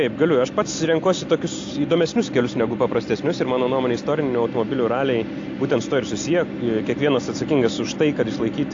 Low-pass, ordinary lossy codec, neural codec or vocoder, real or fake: 7.2 kHz; AAC, 48 kbps; none; real